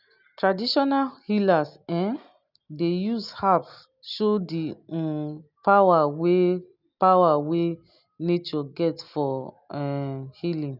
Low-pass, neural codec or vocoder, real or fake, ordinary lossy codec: 5.4 kHz; none; real; none